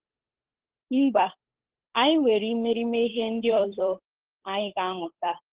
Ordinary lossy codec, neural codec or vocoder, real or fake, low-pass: Opus, 16 kbps; codec, 16 kHz, 8 kbps, FunCodec, trained on Chinese and English, 25 frames a second; fake; 3.6 kHz